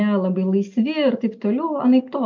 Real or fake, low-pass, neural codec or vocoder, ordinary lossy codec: real; 7.2 kHz; none; MP3, 48 kbps